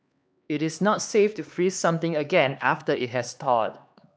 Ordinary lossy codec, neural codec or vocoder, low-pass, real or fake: none; codec, 16 kHz, 2 kbps, X-Codec, HuBERT features, trained on LibriSpeech; none; fake